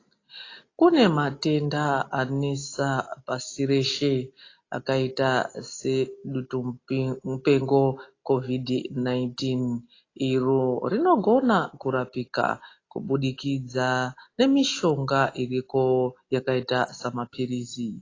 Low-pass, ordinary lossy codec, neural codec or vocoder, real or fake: 7.2 kHz; AAC, 32 kbps; none; real